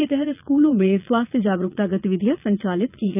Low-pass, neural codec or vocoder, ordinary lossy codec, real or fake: 3.6 kHz; vocoder, 22.05 kHz, 80 mel bands, Vocos; none; fake